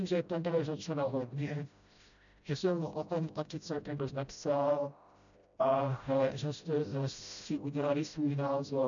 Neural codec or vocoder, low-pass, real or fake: codec, 16 kHz, 0.5 kbps, FreqCodec, smaller model; 7.2 kHz; fake